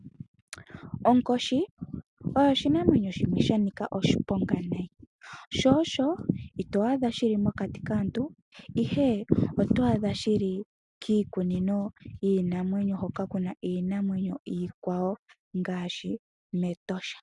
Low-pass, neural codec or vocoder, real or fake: 10.8 kHz; none; real